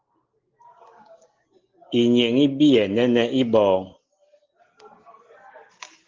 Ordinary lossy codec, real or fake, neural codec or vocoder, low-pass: Opus, 16 kbps; real; none; 7.2 kHz